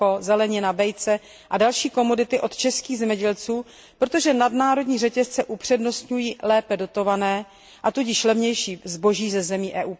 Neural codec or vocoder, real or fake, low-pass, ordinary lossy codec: none; real; none; none